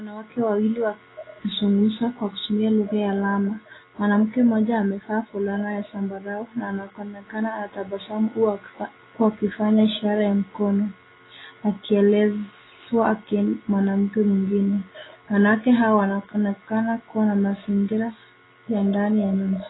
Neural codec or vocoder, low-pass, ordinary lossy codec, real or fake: none; 7.2 kHz; AAC, 16 kbps; real